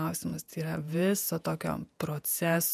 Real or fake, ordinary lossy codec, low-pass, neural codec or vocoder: fake; MP3, 96 kbps; 14.4 kHz; vocoder, 48 kHz, 128 mel bands, Vocos